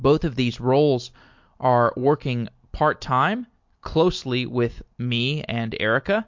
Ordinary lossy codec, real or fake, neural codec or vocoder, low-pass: MP3, 48 kbps; real; none; 7.2 kHz